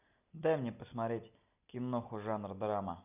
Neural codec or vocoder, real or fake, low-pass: none; real; 3.6 kHz